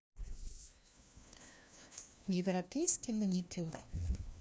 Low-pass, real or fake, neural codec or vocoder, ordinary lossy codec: none; fake; codec, 16 kHz, 1 kbps, FunCodec, trained on LibriTTS, 50 frames a second; none